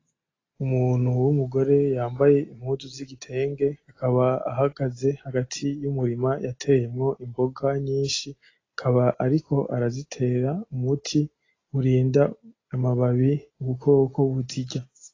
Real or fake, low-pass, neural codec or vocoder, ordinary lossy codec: real; 7.2 kHz; none; AAC, 32 kbps